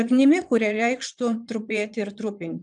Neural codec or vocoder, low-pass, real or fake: vocoder, 22.05 kHz, 80 mel bands, Vocos; 9.9 kHz; fake